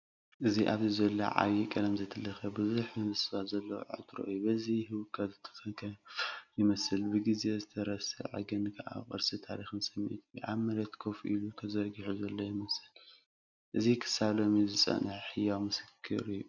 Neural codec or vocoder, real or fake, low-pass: none; real; 7.2 kHz